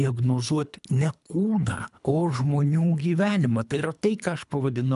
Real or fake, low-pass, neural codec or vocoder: fake; 10.8 kHz; codec, 24 kHz, 3 kbps, HILCodec